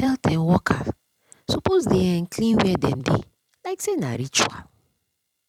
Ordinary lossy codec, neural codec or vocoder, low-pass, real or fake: none; vocoder, 44.1 kHz, 128 mel bands every 256 samples, BigVGAN v2; 19.8 kHz; fake